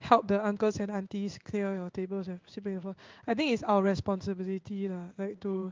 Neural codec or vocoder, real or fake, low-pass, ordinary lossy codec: codec, 16 kHz in and 24 kHz out, 1 kbps, XY-Tokenizer; fake; 7.2 kHz; Opus, 32 kbps